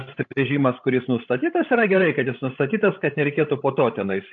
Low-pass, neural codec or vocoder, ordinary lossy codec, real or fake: 7.2 kHz; codec, 16 kHz, 16 kbps, FreqCodec, larger model; AAC, 64 kbps; fake